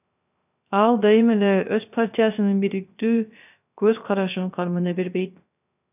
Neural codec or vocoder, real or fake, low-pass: codec, 16 kHz, 0.3 kbps, FocalCodec; fake; 3.6 kHz